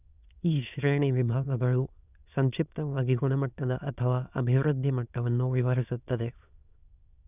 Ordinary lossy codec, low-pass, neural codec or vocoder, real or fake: none; 3.6 kHz; autoencoder, 22.05 kHz, a latent of 192 numbers a frame, VITS, trained on many speakers; fake